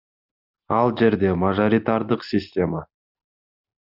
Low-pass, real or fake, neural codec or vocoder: 5.4 kHz; real; none